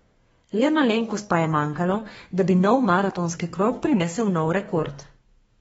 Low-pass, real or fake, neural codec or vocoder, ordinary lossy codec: 14.4 kHz; fake; codec, 32 kHz, 1.9 kbps, SNAC; AAC, 24 kbps